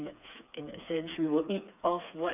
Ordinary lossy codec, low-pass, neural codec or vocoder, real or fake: none; 3.6 kHz; codec, 16 kHz, 4 kbps, FreqCodec, smaller model; fake